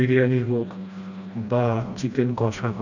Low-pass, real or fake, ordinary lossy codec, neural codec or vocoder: 7.2 kHz; fake; none; codec, 16 kHz, 1 kbps, FreqCodec, smaller model